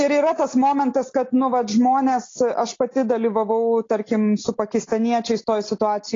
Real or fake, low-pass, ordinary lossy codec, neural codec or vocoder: real; 7.2 kHz; AAC, 32 kbps; none